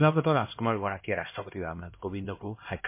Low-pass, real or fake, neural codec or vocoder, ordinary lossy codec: 3.6 kHz; fake; codec, 16 kHz, 1 kbps, X-Codec, HuBERT features, trained on LibriSpeech; MP3, 24 kbps